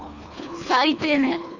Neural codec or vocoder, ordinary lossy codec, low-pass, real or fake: codec, 24 kHz, 3 kbps, HILCodec; none; 7.2 kHz; fake